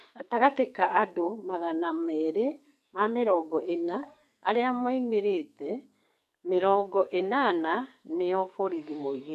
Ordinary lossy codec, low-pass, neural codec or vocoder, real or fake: MP3, 64 kbps; 14.4 kHz; codec, 44.1 kHz, 2.6 kbps, SNAC; fake